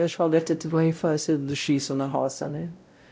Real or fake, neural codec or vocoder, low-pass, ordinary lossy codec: fake; codec, 16 kHz, 0.5 kbps, X-Codec, WavLM features, trained on Multilingual LibriSpeech; none; none